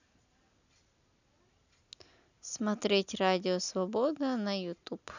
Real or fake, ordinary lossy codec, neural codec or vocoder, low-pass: real; none; none; 7.2 kHz